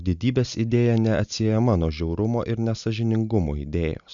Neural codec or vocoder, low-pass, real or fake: none; 7.2 kHz; real